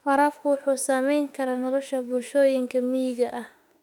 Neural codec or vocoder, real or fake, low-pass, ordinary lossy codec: autoencoder, 48 kHz, 32 numbers a frame, DAC-VAE, trained on Japanese speech; fake; 19.8 kHz; none